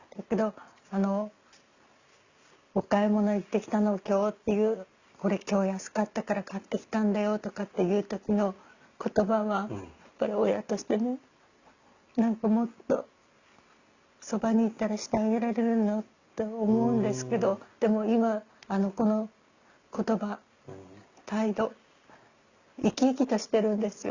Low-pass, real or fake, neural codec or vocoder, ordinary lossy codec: 7.2 kHz; real; none; Opus, 64 kbps